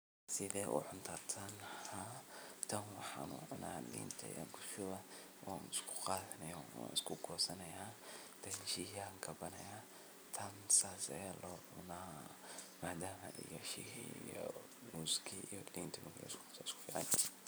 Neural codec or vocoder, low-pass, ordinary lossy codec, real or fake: vocoder, 44.1 kHz, 128 mel bands every 256 samples, BigVGAN v2; none; none; fake